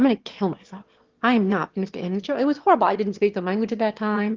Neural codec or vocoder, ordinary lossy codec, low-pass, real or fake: autoencoder, 22.05 kHz, a latent of 192 numbers a frame, VITS, trained on one speaker; Opus, 16 kbps; 7.2 kHz; fake